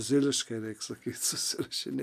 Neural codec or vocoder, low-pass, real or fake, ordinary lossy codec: none; 14.4 kHz; real; AAC, 96 kbps